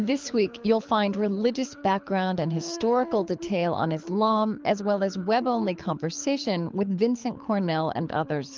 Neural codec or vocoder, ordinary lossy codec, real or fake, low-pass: codec, 24 kHz, 6 kbps, HILCodec; Opus, 32 kbps; fake; 7.2 kHz